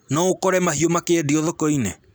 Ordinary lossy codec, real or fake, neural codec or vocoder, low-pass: none; real; none; none